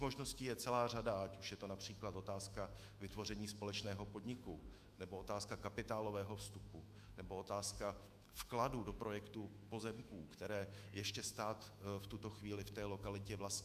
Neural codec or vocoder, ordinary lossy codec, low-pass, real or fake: autoencoder, 48 kHz, 128 numbers a frame, DAC-VAE, trained on Japanese speech; Opus, 64 kbps; 14.4 kHz; fake